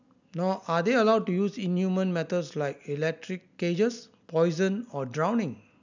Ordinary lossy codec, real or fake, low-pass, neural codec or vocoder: none; real; 7.2 kHz; none